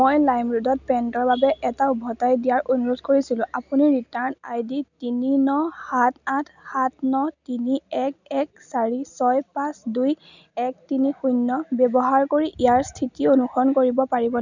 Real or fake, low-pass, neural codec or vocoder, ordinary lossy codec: real; 7.2 kHz; none; none